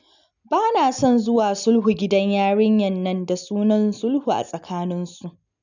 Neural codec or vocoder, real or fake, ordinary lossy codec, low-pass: none; real; none; 7.2 kHz